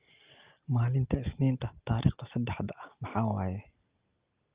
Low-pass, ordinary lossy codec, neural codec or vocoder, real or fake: 3.6 kHz; Opus, 32 kbps; none; real